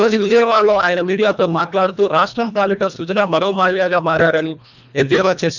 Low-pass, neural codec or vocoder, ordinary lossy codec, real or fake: 7.2 kHz; codec, 24 kHz, 1.5 kbps, HILCodec; none; fake